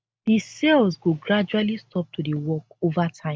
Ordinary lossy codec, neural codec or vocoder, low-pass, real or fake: none; none; none; real